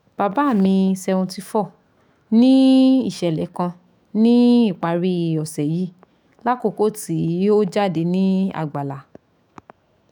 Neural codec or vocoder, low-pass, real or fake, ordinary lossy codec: autoencoder, 48 kHz, 128 numbers a frame, DAC-VAE, trained on Japanese speech; 19.8 kHz; fake; none